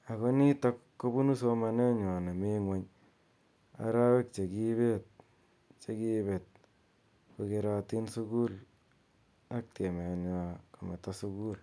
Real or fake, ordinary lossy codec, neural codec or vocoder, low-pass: real; none; none; none